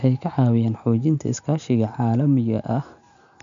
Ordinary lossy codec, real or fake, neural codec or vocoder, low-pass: MP3, 96 kbps; real; none; 7.2 kHz